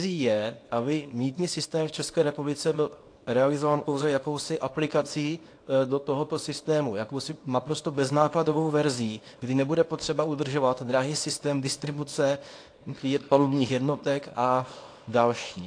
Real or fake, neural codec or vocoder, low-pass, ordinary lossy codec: fake; codec, 24 kHz, 0.9 kbps, WavTokenizer, small release; 9.9 kHz; AAC, 48 kbps